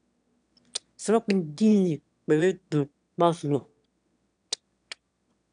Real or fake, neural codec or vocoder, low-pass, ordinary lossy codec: fake; autoencoder, 22.05 kHz, a latent of 192 numbers a frame, VITS, trained on one speaker; 9.9 kHz; none